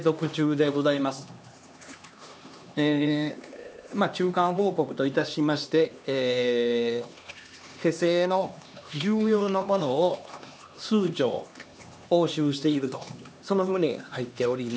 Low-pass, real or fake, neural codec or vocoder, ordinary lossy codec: none; fake; codec, 16 kHz, 2 kbps, X-Codec, HuBERT features, trained on LibriSpeech; none